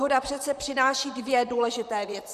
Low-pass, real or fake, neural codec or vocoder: 14.4 kHz; fake; vocoder, 44.1 kHz, 128 mel bands, Pupu-Vocoder